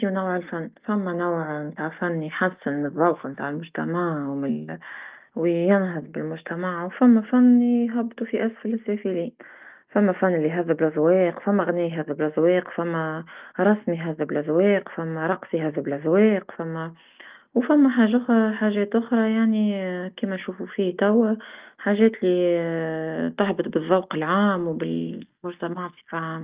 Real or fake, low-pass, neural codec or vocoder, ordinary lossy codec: real; 3.6 kHz; none; Opus, 32 kbps